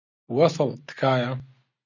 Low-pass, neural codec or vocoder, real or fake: 7.2 kHz; none; real